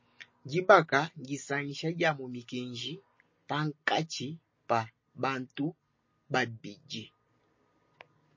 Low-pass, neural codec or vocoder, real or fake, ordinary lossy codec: 7.2 kHz; none; real; MP3, 32 kbps